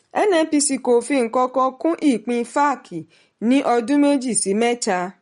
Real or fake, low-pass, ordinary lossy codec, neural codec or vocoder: real; 10.8 kHz; MP3, 48 kbps; none